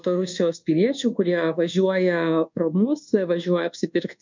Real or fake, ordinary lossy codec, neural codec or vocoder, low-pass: fake; MP3, 64 kbps; codec, 24 kHz, 1.2 kbps, DualCodec; 7.2 kHz